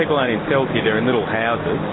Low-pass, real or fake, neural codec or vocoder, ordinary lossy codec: 7.2 kHz; real; none; AAC, 16 kbps